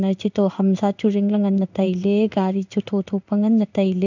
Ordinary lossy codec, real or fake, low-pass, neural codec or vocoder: none; fake; 7.2 kHz; codec, 16 kHz in and 24 kHz out, 1 kbps, XY-Tokenizer